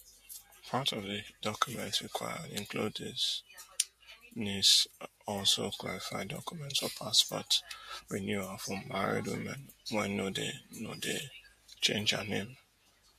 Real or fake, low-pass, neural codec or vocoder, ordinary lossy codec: fake; 14.4 kHz; vocoder, 48 kHz, 128 mel bands, Vocos; MP3, 64 kbps